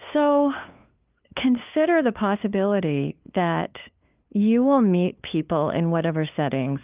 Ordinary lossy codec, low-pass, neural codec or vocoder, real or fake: Opus, 24 kbps; 3.6 kHz; codec, 16 kHz, 2 kbps, FunCodec, trained on LibriTTS, 25 frames a second; fake